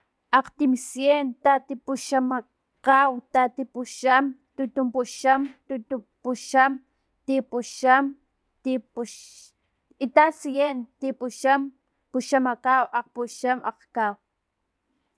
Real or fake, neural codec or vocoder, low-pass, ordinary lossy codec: fake; vocoder, 22.05 kHz, 80 mel bands, WaveNeXt; none; none